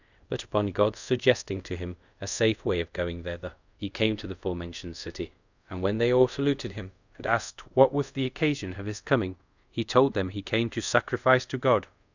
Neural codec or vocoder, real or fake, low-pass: codec, 24 kHz, 0.5 kbps, DualCodec; fake; 7.2 kHz